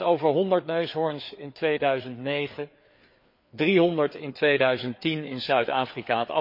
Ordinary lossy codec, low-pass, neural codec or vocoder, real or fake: MP3, 32 kbps; 5.4 kHz; codec, 16 kHz, 4 kbps, FreqCodec, larger model; fake